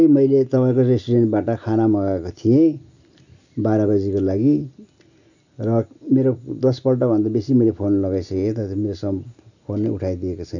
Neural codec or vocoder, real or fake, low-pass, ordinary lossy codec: none; real; 7.2 kHz; none